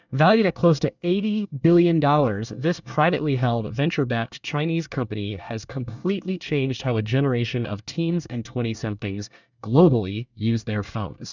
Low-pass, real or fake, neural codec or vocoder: 7.2 kHz; fake; codec, 24 kHz, 1 kbps, SNAC